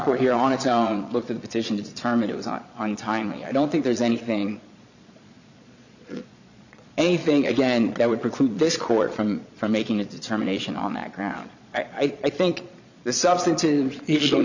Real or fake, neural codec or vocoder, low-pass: fake; vocoder, 22.05 kHz, 80 mel bands, Vocos; 7.2 kHz